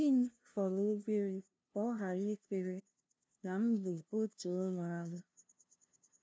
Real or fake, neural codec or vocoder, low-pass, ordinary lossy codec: fake; codec, 16 kHz, 0.5 kbps, FunCodec, trained on LibriTTS, 25 frames a second; none; none